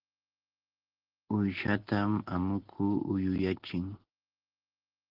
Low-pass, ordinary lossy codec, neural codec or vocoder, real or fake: 5.4 kHz; Opus, 16 kbps; none; real